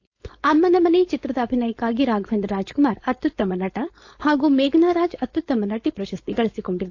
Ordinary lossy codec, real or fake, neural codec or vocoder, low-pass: AAC, 48 kbps; fake; codec, 16 kHz, 4.8 kbps, FACodec; 7.2 kHz